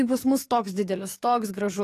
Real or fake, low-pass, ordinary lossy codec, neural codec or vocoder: fake; 14.4 kHz; AAC, 48 kbps; codec, 44.1 kHz, 7.8 kbps, DAC